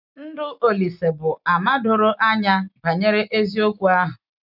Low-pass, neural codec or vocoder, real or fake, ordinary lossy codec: 5.4 kHz; none; real; none